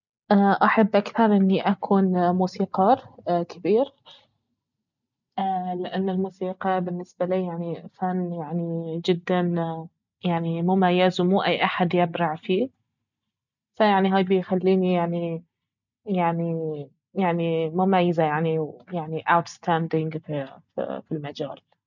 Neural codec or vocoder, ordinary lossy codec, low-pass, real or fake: none; none; 7.2 kHz; real